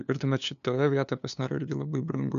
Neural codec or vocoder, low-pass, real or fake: codec, 16 kHz, 2 kbps, FunCodec, trained on LibriTTS, 25 frames a second; 7.2 kHz; fake